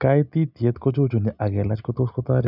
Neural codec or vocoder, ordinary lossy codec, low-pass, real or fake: none; none; 5.4 kHz; real